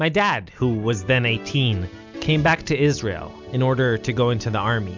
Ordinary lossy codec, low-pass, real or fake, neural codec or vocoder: MP3, 64 kbps; 7.2 kHz; real; none